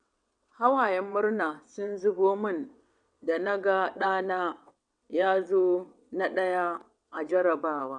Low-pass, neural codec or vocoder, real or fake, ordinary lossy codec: 9.9 kHz; vocoder, 22.05 kHz, 80 mel bands, WaveNeXt; fake; none